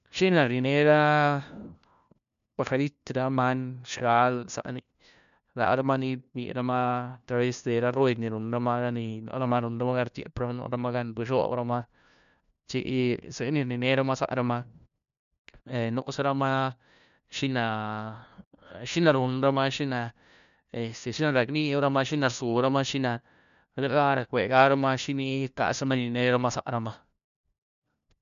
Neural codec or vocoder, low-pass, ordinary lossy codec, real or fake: codec, 16 kHz, 1 kbps, FunCodec, trained on LibriTTS, 50 frames a second; 7.2 kHz; none; fake